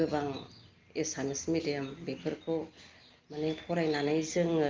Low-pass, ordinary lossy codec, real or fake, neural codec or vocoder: 7.2 kHz; Opus, 24 kbps; real; none